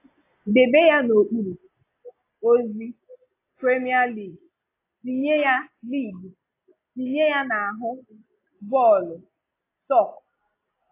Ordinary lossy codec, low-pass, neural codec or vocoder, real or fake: AAC, 24 kbps; 3.6 kHz; none; real